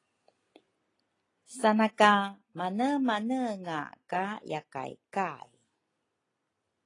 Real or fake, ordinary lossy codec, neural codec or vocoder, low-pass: real; AAC, 32 kbps; none; 10.8 kHz